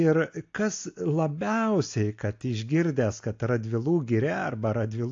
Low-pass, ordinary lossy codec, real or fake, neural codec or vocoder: 7.2 kHz; AAC, 48 kbps; real; none